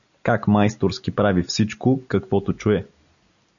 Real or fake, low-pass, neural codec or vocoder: real; 7.2 kHz; none